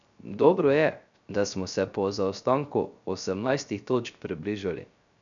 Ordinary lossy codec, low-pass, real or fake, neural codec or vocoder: none; 7.2 kHz; fake; codec, 16 kHz, 0.3 kbps, FocalCodec